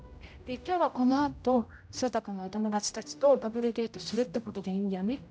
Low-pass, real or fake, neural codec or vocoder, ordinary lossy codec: none; fake; codec, 16 kHz, 0.5 kbps, X-Codec, HuBERT features, trained on general audio; none